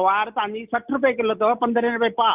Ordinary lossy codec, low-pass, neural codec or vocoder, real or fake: Opus, 24 kbps; 3.6 kHz; none; real